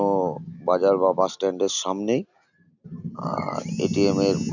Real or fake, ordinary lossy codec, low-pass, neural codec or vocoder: real; none; 7.2 kHz; none